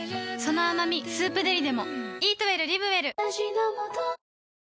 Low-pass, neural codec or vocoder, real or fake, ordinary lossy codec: none; none; real; none